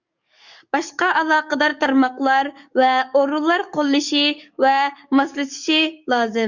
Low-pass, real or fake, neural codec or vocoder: 7.2 kHz; fake; codec, 44.1 kHz, 7.8 kbps, DAC